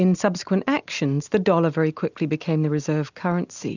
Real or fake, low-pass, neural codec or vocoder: real; 7.2 kHz; none